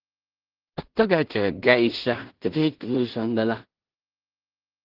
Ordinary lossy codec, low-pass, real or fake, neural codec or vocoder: Opus, 16 kbps; 5.4 kHz; fake; codec, 16 kHz in and 24 kHz out, 0.4 kbps, LongCat-Audio-Codec, two codebook decoder